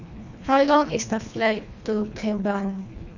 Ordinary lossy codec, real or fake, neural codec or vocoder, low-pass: none; fake; codec, 24 kHz, 1.5 kbps, HILCodec; 7.2 kHz